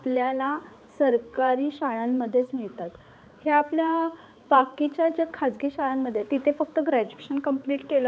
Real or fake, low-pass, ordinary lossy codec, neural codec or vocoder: fake; none; none; codec, 16 kHz, 4 kbps, X-Codec, HuBERT features, trained on balanced general audio